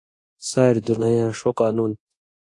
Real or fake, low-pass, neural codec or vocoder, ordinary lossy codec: fake; 10.8 kHz; codec, 24 kHz, 0.9 kbps, DualCodec; AAC, 48 kbps